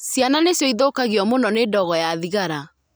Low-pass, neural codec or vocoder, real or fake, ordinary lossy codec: none; none; real; none